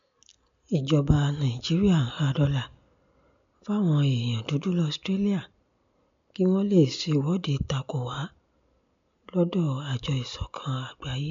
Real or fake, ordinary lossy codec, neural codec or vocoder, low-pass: real; MP3, 64 kbps; none; 7.2 kHz